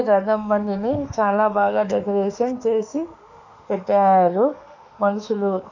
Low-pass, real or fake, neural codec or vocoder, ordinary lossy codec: 7.2 kHz; fake; autoencoder, 48 kHz, 32 numbers a frame, DAC-VAE, trained on Japanese speech; none